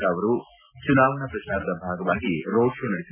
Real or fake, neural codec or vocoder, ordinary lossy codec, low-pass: real; none; none; 3.6 kHz